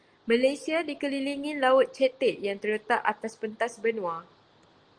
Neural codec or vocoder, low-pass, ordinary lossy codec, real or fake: none; 9.9 kHz; Opus, 24 kbps; real